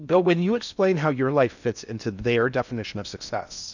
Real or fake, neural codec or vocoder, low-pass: fake; codec, 16 kHz in and 24 kHz out, 0.6 kbps, FocalCodec, streaming, 2048 codes; 7.2 kHz